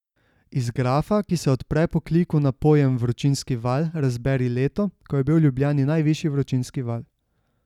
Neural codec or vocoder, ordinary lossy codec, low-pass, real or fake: none; none; 19.8 kHz; real